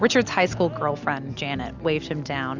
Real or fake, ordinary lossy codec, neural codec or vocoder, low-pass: real; Opus, 64 kbps; none; 7.2 kHz